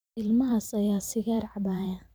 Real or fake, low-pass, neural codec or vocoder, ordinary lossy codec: fake; none; vocoder, 44.1 kHz, 128 mel bands every 512 samples, BigVGAN v2; none